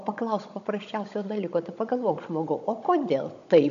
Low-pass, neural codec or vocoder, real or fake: 7.2 kHz; codec, 16 kHz, 16 kbps, FunCodec, trained on Chinese and English, 50 frames a second; fake